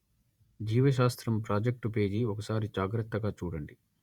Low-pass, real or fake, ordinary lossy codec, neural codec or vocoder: 19.8 kHz; fake; Opus, 64 kbps; vocoder, 48 kHz, 128 mel bands, Vocos